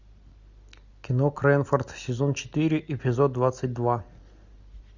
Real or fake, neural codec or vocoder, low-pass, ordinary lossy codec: real; none; 7.2 kHz; Opus, 64 kbps